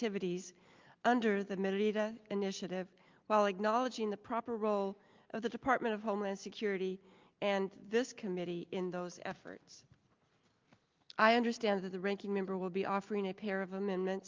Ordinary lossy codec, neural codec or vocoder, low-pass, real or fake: Opus, 24 kbps; none; 7.2 kHz; real